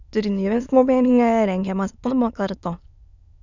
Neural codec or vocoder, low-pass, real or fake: autoencoder, 22.05 kHz, a latent of 192 numbers a frame, VITS, trained on many speakers; 7.2 kHz; fake